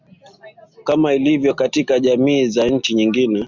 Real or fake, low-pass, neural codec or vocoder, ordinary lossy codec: real; 7.2 kHz; none; Opus, 64 kbps